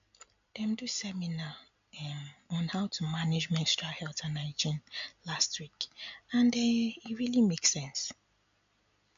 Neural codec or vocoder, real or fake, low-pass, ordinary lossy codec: none; real; 7.2 kHz; MP3, 64 kbps